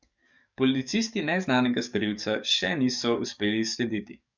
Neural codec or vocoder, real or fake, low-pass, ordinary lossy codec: codec, 44.1 kHz, 7.8 kbps, DAC; fake; 7.2 kHz; none